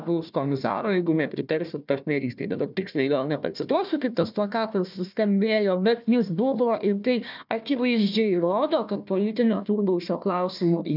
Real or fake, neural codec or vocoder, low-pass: fake; codec, 16 kHz, 1 kbps, FunCodec, trained on Chinese and English, 50 frames a second; 5.4 kHz